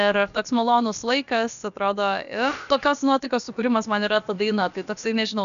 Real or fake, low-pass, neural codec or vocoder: fake; 7.2 kHz; codec, 16 kHz, about 1 kbps, DyCAST, with the encoder's durations